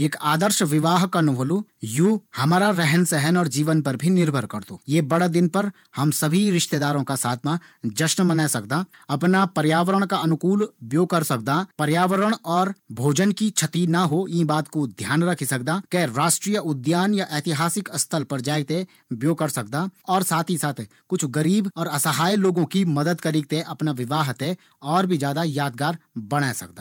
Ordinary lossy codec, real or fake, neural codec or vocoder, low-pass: none; fake; vocoder, 48 kHz, 128 mel bands, Vocos; 19.8 kHz